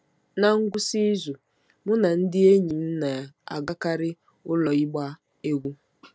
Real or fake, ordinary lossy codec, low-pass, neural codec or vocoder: real; none; none; none